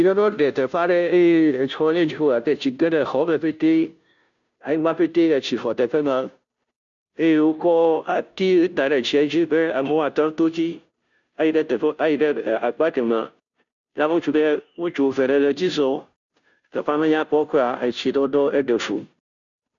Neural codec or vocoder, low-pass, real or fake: codec, 16 kHz, 0.5 kbps, FunCodec, trained on Chinese and English, 25 frames a second; 7.2 kHz; fake